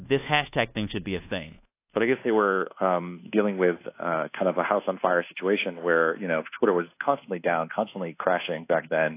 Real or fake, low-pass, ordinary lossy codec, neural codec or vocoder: fake; 3.6 kHz; AAC, 24 kbps; codec, 24 kHz, 1.2 kbps, DualCodec